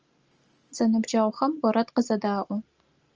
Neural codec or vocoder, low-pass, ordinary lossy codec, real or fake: none; 7.2 kHz; Opus, 24 kbps; real